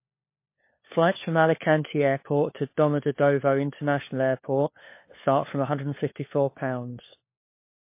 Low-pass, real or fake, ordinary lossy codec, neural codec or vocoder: 3.6 kHz; fake; MP3, 24 kbps; codec, 16 kHz, 4 kbps, FunCodec, trained on LibriTTS, 50 frames a second